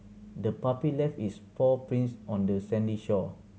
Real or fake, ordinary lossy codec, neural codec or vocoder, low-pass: real; none; none; none